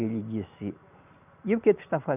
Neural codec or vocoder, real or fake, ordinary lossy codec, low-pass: none; real; none; 3.6 kHz